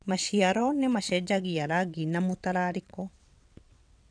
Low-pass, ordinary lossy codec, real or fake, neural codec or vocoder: 9.9 kHz; none; fake; vocoder, 22.05 kHz, 80 mel bands, WaveNeXt